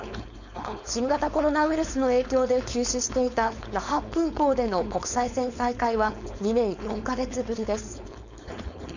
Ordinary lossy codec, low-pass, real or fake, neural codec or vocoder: none; 7.2 kHz; fake; codec, 16 kHz, 4.8 kbps, FACodec